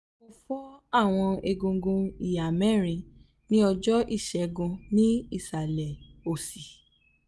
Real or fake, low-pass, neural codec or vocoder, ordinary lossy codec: real; none; none; none